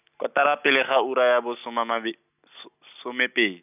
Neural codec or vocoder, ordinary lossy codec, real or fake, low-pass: none; none; real; 3.6 kHz